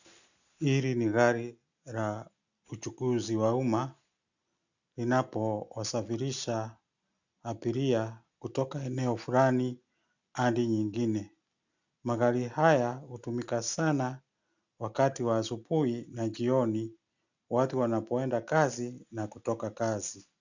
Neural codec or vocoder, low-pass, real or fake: none; 7.2 kHz; real